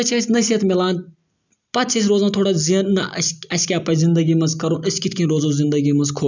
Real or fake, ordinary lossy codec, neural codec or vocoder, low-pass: real; none; none; 7.2 kHz